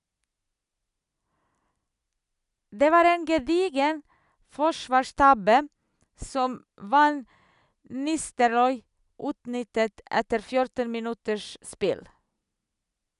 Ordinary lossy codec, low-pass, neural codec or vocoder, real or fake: none; 10.8 kHz; none; real